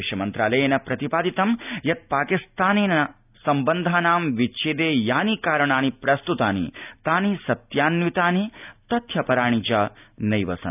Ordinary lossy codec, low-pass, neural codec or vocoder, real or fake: none; 3.6 kHz; none; real